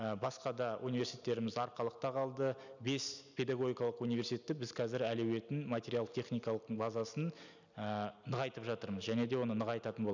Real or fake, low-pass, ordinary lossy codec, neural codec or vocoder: real; 7.2 kHz; none; none